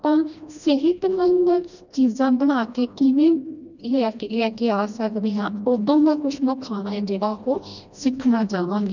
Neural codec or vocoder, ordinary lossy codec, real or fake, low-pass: codec, 16 kHz, 1 kbps, FreqCodec, smaller model; none; fake; 7.2 kHz